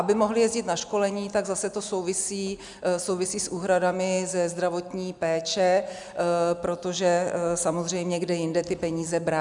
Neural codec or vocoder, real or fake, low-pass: none; real; 10.8 kHz